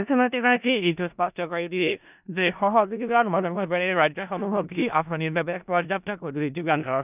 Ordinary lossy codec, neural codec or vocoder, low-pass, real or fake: none; codec, 16 kHz in and 24 kHz out, 0.4 kbps, LongCat-Audio-Codec, four codebook decoder; 3.6 kHz; fake